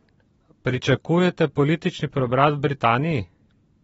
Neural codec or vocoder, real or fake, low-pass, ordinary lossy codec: vocoder, 44.1 kHz, 128 mel bands every 512 samples, BigVGAN v2; fake; 19.8 kHz; AAC, 24 kbps